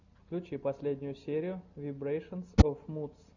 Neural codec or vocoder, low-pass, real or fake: none; 7.2 kHz; real